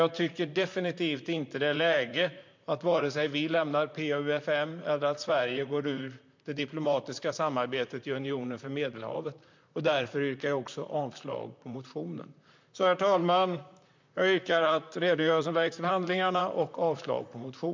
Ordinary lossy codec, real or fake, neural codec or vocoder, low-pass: MP3, 64 kbps; fake; vocoder, 44.1 kHz, 128 mel bands, Pupu-Vocoder; 7.2 kHz